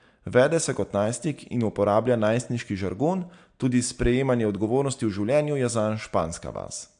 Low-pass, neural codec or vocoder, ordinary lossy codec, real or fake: 9.9 kHz; none; AAC, 64 kbps; real